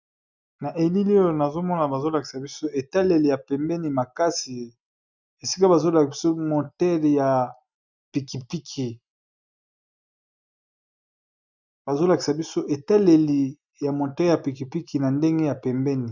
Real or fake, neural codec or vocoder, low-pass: real; none; 7.2 kHz